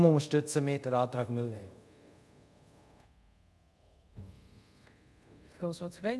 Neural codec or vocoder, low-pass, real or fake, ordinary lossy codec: codec, 24 kHz, 0.5 kbps, DualCodec; none; fake; none